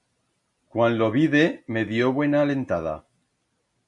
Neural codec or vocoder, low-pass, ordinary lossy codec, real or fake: none; 10.8 kHz; MP3, 64 kbps; real